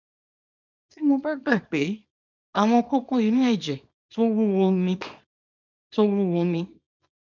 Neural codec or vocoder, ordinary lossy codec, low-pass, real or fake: codec, 24 kHz, 0.9 kbps, WavTokenizer, small release; none; 7.2 kHz; fake